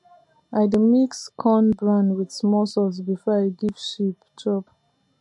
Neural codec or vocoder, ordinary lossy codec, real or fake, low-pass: none; MP3, 48 kbps; real; 10.8 kHz